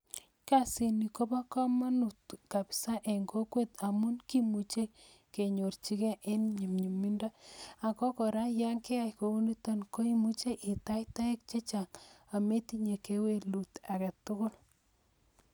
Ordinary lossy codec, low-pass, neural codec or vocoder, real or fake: none; none; none; real